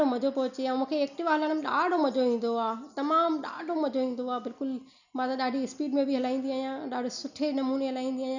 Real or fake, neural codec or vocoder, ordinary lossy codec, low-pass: real; none; none; 7.2 kHz